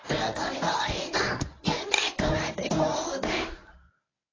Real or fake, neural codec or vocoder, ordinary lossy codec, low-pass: fake; codec, 24 kHz, 0.9 kbps, WavTokenizer, medium speech release version 1; AAC, 32 kbps; 7.2 kHz